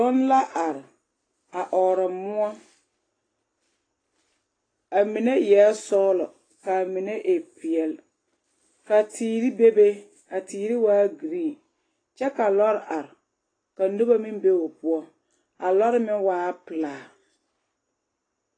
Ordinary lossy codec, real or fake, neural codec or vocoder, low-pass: AAC, 32 kbps; real; none; 9.9 kHz